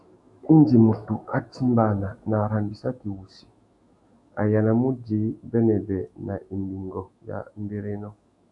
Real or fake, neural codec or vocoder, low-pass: fake; autoencoder, 48 kHz, 128 numbers a frame, DAC-VAE, trained on Japanese speech; 10.8 kHz